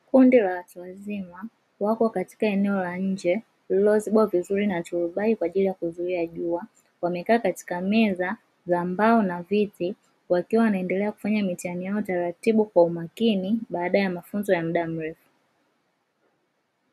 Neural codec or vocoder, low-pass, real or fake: none; 14.4 kHz; real